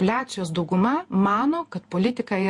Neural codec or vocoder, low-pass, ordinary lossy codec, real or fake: vocoder, 48 kHz, 128 mel bands, Vocos; 10.8 kHz; MP3, 48 kbps; fake